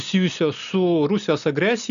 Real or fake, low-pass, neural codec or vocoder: real; 7.2 kHz; none